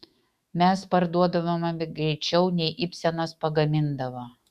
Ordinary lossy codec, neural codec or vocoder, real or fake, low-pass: Opus, 64 kbps; autoencoder, 48 kHz, 128 numbers a frame, DAC-VAE, trained on Japanese speech; fake; 14.4 kHz